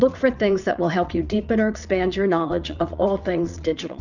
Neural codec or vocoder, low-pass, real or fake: vocoder, 22.05 kHz, 80 mel bands, WaveNeXt; 7.2 kHz; fake